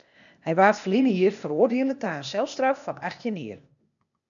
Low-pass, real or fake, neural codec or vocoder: 7.2 kHz; fake; codec, 16 kHz, 0.8 kbps, ZipCodec